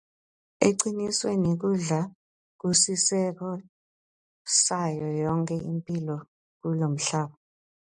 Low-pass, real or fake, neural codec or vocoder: 10.8 kHz; real; none